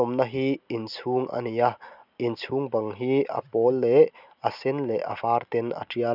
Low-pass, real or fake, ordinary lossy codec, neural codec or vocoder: 5.4 kHz; real; none; none